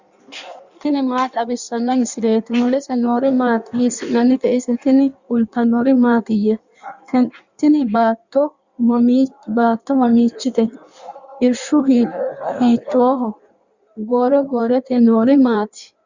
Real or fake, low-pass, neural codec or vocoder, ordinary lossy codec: fake; 7.2 kHz; codec, 16 kHz in and 24 kHz out, 1.1 kbps, FireRedTTS-2 codec; Opus, 64 kbps